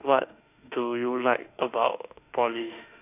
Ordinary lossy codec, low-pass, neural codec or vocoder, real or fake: none; 3.6 kHz; autoencoder, 48 kHz, 32 numbers a frame, DAC-VAE, trained on Japanese speech; fake